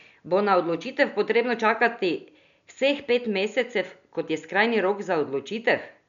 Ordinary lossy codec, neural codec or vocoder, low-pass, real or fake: none; none; 7.2 kHz; real